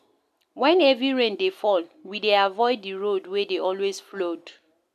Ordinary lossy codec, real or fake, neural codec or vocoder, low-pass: none; real; none; 14.4 kHz